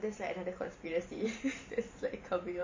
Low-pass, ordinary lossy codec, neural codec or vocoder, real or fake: 7.2 kHz; MP3, 32 kbps; vocoder, 44.1 kHz, 128 mel bands every 512 samples, BigVGAN v2; fake